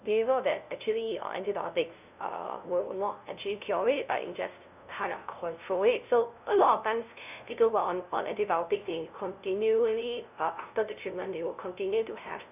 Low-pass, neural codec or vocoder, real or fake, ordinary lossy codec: 3.6 kHz; codec, 16 kHz, 0.5 kbps, FunCodec, trained on LibriTTS, 25 frames a second; fake; none